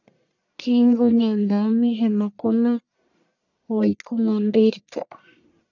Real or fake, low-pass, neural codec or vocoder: fake; 7.2 kHz; codec, 44.1 kHz, 1.7 kbps, Pupu-Codec